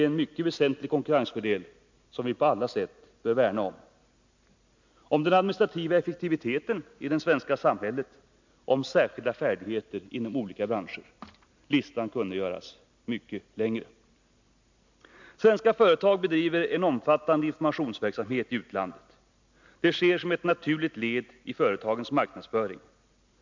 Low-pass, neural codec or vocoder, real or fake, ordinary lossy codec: 7.2 kHz; none; real; MP3, 64 kbps